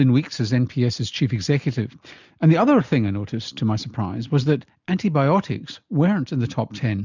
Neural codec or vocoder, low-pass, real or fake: none; 7.2 kHz; real